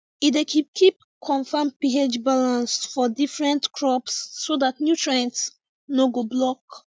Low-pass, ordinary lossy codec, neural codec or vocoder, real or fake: none; none; none; real